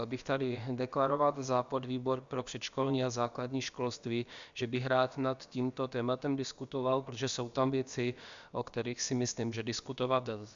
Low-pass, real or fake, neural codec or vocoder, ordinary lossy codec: 7.2 kHz; fake; codec, 16 kHz, about 1 kbps, DyCAST, with the encoder's durations; Opus, 64 kbps